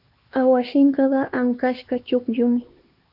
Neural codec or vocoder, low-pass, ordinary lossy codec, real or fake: codec, 16 kHz, 2 kbps, X-Codec, HuBERT features, trained on LibriSpeech; 5.4 kHz; AAC, 48 kbps; fake